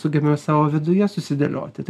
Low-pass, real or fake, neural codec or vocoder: 14.4 kHz; real; none